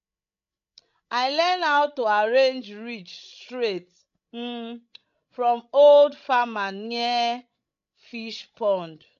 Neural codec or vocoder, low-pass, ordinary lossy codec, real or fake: codec, 16 kHz, 16 kbps, FreqCodec, larger model; 7.2 kHz; none; fake